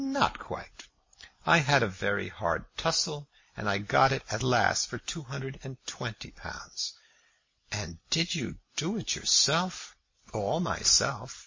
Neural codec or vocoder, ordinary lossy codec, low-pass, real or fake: none; MP3, 32 kbps; 7.2 kHz; real